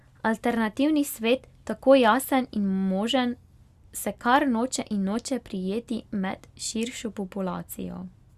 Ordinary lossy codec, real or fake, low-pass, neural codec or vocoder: Opus, 64 kbps; real; 14.4 kHz; none